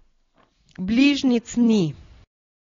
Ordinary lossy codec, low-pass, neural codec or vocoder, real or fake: AAC, 32 kbps; 7.2 kHz; none; real